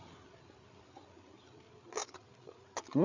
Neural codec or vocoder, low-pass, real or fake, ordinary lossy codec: codec, 16 kHz, 8 kbps, FreqCodec, larger model; 7.2 kHz; fake; MP3, 64 kbps